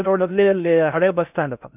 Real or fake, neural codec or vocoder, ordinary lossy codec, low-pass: fake; codec, 16 kHz in and 24 kHz out, 0.6 kbps, FocalCodec, streaming, 4096 codes; none; 3.6 kHz